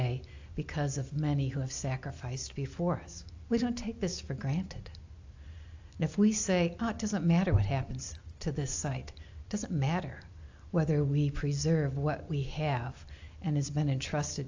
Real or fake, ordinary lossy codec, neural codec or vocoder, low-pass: real; AAC, 48 kbps; none; 7.2 kHz